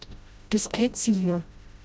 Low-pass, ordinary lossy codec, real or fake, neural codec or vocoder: none; none; fake; codec, 16 kHz, 0.5 kbps, FreqCodec, smaller model